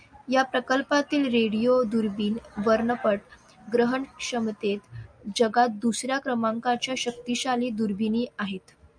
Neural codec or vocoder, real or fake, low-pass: none; real; 9.9 kHz